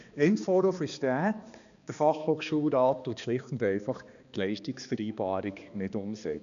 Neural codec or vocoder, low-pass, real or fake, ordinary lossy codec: codec, 16 kHz, 2 kbps, X-Codec, HuBERT features, trained on balanced general audio; 7.2 kHz; fake; none